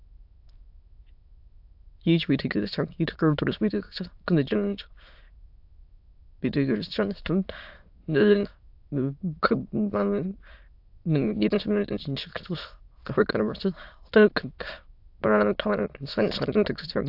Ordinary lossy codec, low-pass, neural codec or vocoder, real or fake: AAC, 48 kbps; 5.4 kHz; autoencoder, 22.05 kHz, a latent of 192 numbers a frame, VITS, trained on many speakers; fake